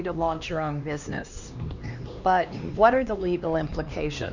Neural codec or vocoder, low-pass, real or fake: codec, 16 kHz, 2 kbps, X-Codec, HuBERT features, trained on LibriSpeech; 7.2 kHz; fake